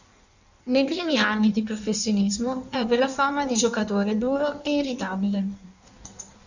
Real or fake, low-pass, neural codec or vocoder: fake; 7.2 kHz; codec, 16 kHz in and 24 kHz out, 1.1 kbps, FireRedTTS-2 codec